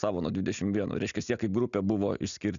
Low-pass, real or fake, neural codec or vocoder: 7.2 kHz; real; none